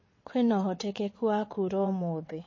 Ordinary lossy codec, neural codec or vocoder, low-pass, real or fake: MP3, 32 kbps; vocoder, 22.05 kHz, 80 mel bands, WaveNeXt; 7.2 kHz; fake